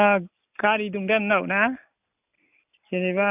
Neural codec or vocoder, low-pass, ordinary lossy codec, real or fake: none; 3.6 kHz; none; real